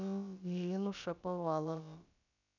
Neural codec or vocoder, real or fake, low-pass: codec, 16 kHz, about 1 kbps, DyCAST, with the encoder's durations; fake; 7.2 kHz